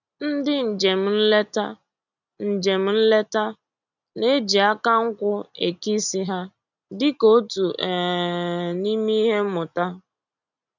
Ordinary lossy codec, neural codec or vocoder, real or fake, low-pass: none; none; real; 7.2 kHz